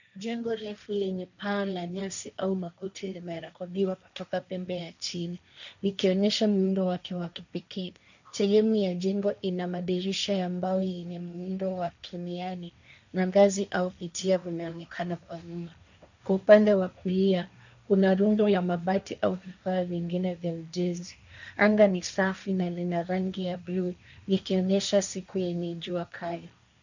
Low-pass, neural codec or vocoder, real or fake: 7.2 kHz; codec, 16 kHz, 1.1 kbps, Voila-Tokenizer; fake